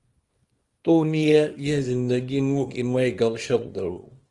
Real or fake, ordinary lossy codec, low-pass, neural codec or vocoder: fake; Opus, 24 kbps; 10.8 kHz; codec, 24 kHz, 0.9 kbps, WavTokenizer, small release